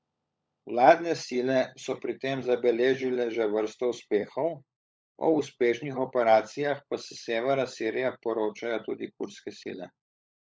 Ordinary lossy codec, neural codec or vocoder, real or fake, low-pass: none; codec, 16 kHz, 16 kbps, FunCodec, trained on LibriTTS, 50 frames a second; fake; none